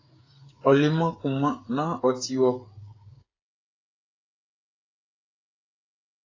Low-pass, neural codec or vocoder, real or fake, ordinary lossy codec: 7.2 kHz; codec, 16 kHz, 8 kbps, FreqCodec, smaller model; fake; AAC, 32 kbps